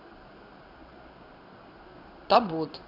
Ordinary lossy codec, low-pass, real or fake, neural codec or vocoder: none; 5.4 kHz; fake; codec, 16 kHz in and 24 kHz out, 1 kbps, XY-Tokenizer